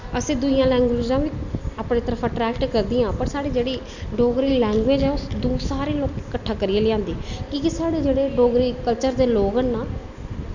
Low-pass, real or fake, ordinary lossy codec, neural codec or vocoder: 7.2 kHz; real; none; none